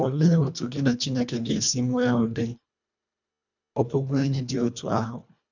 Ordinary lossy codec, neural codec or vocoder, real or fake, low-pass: none; codec, 24 kHz, 1.5 kbps, HILCodec; fake; 7.2 kHz